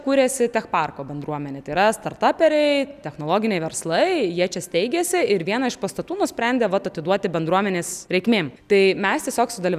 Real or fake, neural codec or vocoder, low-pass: real; none; 14.4 kHz